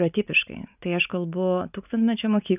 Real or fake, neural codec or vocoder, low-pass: real; none; 3.6 kHz